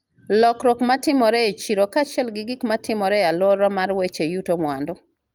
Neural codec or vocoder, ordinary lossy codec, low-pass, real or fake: none; Opus, 32 kbps; 19.8 kHz; real